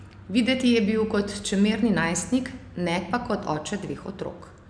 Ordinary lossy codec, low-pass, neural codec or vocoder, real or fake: none; 9.9 kHz; none; real